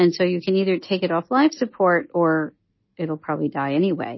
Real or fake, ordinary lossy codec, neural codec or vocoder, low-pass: real; MP3, 24 kbps; none; 7.2 kHz